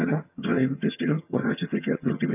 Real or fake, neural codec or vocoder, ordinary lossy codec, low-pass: fake; vocoder, 22.05 kHz, 80 mel bands, HiFi-GAN; none; 3.6 kHz